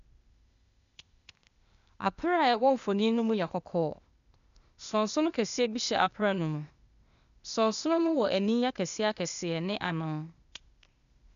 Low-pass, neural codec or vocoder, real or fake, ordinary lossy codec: 7.2 kHz; codec, 16 kHz, 0.8 kbps, ZipCodec; fake; none